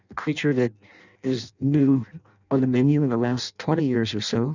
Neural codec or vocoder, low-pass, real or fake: codec, 16 kHz in and 24 kHz out, 0.6 kbps, FireRedTTS-2 codec; 7.2 kHz; fake